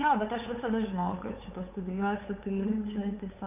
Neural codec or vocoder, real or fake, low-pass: codec, 16 kHz, 8 kbps, FunCodec, trained on LibriTTS, 25 frames a second; fake; 3.6 kHz